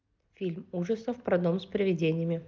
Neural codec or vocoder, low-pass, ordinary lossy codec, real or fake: none; 7.2 kHz; Opus, 24 kbps; real